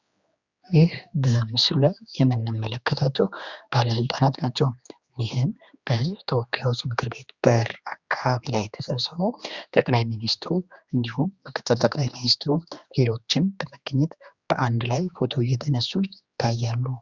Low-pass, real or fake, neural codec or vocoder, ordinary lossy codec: 7.2 kHz; fake; codec, 16 kHz, 2 kbps, X-Codec, HuBERT features, trained on general audio; Opus, 64 kbps